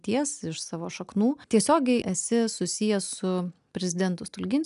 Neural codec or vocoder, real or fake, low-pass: none; real; 10.8 kHz